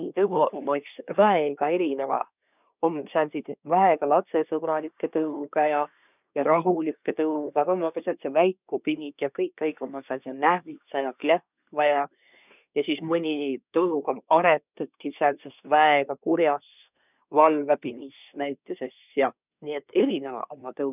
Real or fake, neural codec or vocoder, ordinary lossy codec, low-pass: fake; codec, 24 kHz, 1 kbps, SNAC; none; 3.6 kHz